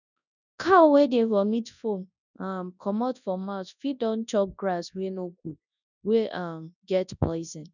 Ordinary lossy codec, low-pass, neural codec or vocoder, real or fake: none; 7.2 kHz; codec, 24 kHz, 0.9 kbps, WavTokenizer, large speech release; fake